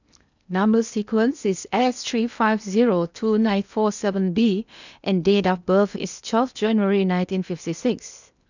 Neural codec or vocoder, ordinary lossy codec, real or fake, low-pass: codec, 16 kHz in and 24 kHz out, 0.8 kbps, FocalCodec, streaming, 65536 codes; none; fake; 7.2 kHz